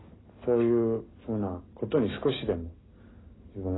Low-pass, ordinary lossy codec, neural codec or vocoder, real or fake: 7.2 kHz; AAC, 16 kbps; none; real